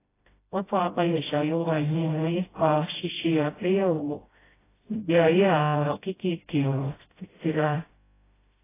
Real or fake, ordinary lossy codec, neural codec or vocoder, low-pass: fake; AAC, 16 kbps; codec, 16 kHz, 0.5 kbps, FreqCodec, smaller model; 3.6 kHz